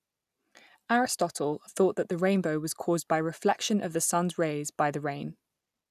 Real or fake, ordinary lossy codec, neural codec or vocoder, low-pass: real; none; none; 14.4 kHz